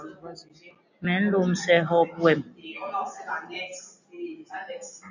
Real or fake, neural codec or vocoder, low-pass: real; none; 7.2 kHz